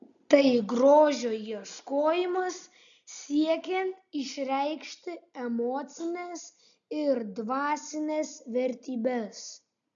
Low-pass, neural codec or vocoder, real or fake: 7.2 kHz; none; real